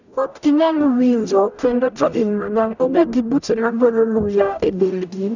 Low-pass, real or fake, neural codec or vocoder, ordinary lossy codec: 7.2 kHz; fake; codec, 44.1 kHz, 0.9 kbps, DAC; none